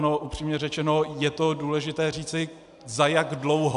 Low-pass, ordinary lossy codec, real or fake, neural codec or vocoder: 10.8 kHz; AAC, 96 kbps; real; none